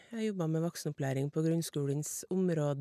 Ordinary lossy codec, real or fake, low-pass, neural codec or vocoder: none; real; 14.4 kHz; none